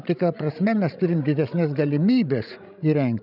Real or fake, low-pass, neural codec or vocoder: fake; 5.4 kHz; codec, 16 kHz, 16 kbps, FreqCodec, larger model